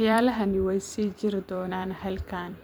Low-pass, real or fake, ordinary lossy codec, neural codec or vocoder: none; fake; none; vocoder, 44.1 kHz, 128 mel bands every 256 samples, BigVGAN v2